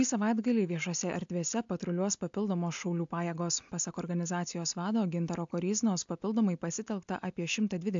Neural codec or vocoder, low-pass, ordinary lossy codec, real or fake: none; 7.2 kHz; AAC, 64 kbps; real